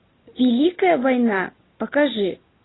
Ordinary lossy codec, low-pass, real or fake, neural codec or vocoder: AAC, 16 kbps; 7.2 kHz; real; none